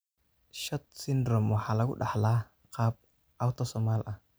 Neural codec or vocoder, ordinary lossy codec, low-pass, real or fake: none; none; none; real